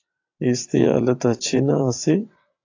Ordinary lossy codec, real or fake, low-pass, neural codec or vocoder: AAC, 48 kbps; fake; 7.2 kHz; vocoder, 22.05 kHz, 80 mel bands, WaveNeXt